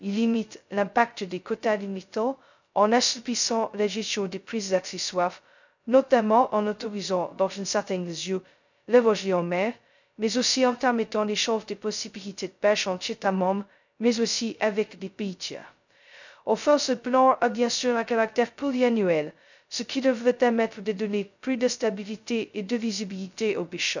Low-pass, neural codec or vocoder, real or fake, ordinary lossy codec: 7.2 kHz; codec, 16 kHz, 0.2 kbps, FocalCodec; fake; none